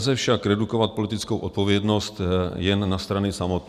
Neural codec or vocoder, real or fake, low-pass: none; real; 14.4 kHz